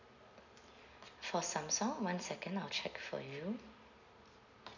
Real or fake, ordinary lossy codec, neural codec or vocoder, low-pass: real; none; none; 7.2 kHz